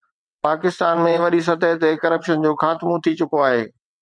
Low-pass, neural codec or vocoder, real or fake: 9.9 kHz; vocoder, 22.05 kHz, 80 mel bands, WaveNeXt; fake